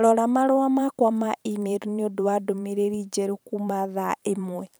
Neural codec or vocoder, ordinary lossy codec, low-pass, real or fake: vocoder, 44.1 kHz, 128 mel bands every 512 samples, BigVGAN v2; none; none; fake